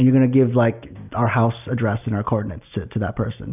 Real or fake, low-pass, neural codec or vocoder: real; 3.6 kHz; none